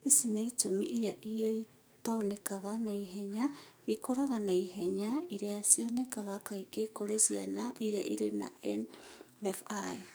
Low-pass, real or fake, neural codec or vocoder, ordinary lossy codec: none; fake; codec, 44.1 kHz, 2.6 kbps, SNAC; none